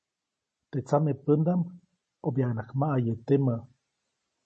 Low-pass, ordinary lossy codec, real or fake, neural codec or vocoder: 10.8 kHz; MP3, 32 kbps; real; none